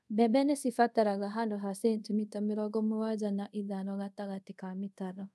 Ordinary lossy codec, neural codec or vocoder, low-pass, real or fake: none; codec, 24 kHz, 0.5 kbps, DualCodec; none; fake